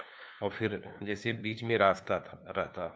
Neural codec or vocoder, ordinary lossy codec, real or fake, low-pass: codec, 16 kHz, 2 kbps, FunCodec, trained on LibriTTS, 25 frames a second; none; fake; none